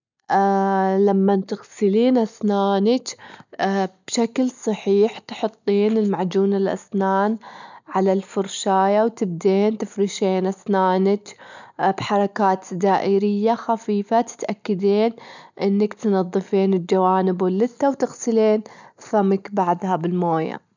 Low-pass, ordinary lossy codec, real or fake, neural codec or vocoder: 7.2 kHz; none; real; none